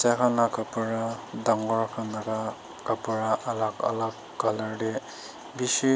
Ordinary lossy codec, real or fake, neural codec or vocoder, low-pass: none; real; none; none